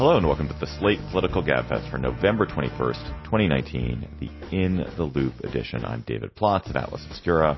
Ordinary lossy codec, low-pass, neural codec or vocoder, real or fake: MP3, 24 kbps; 7.2 kHz; none; real